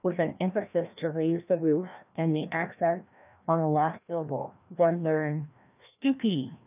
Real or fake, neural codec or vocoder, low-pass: fake; codec, 16 kHz, 1 kbps, FreqCodec, larger model; 3.6 kHz